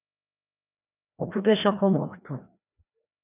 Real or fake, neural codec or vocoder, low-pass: fake; codec, 16 kHz, 1 kbps, FreqCodec, larger model; 3.6 kHz